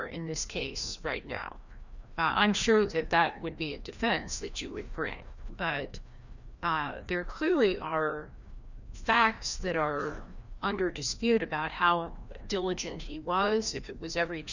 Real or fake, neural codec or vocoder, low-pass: fake; codec, 16 kHz, 1 kbps, FreqCodec, larger model; 7.2 kHz